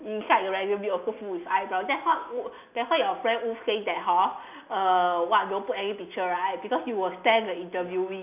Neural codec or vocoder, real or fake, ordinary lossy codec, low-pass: none; real; none; 3.6 kHz